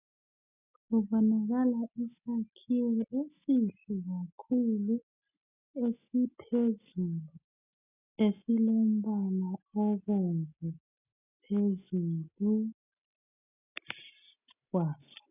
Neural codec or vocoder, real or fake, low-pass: none; real; 3.6 kHz